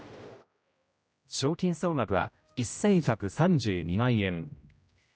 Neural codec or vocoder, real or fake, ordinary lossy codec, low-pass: codec, 16 kHz, 0.5 kbps, X-Codec, HuBERT features, trained on general audio; fake; none; none